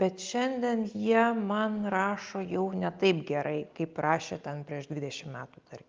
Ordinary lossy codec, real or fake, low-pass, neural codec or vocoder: Opus, 24 kbps; real; 7.2 kHz; none